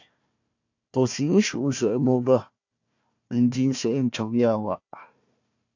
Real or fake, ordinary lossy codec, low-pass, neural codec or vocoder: fake; AAC, 48 kbps; 7.2 kHz; codec, 16 kHz, 1 kbps, FunCodec, trained on Chinese and English, 50 frames a second